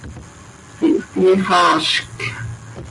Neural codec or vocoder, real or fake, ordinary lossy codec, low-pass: none; real; AAC, 48 kbps; 10.8 kHz